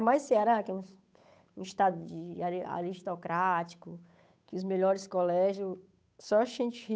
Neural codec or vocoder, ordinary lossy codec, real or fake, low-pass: codec, 16 kHz, 8 kbps, FunCodec, trained on Chinese and English, 25 frames a second; none; fake; none